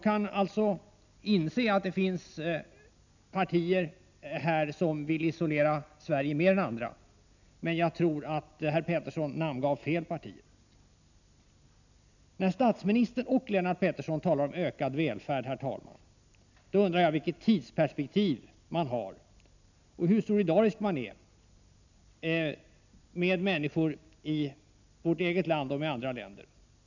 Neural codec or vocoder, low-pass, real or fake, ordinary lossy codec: none; 7.2 kHz; real; none